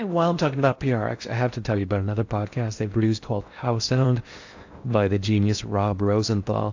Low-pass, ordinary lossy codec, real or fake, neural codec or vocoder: 7.2 kHz; AAC, 48 kbps; fake; codec, 16 kHz in and 24 kHz out, 0.6 kbps, FocalCodec, streaming, 4096 codes